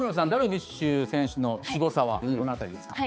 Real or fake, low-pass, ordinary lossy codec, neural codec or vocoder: fake; none; none; codec, 16 kHz, 4 kbps, X-Codec, HuBERT features, trained on balanced general audio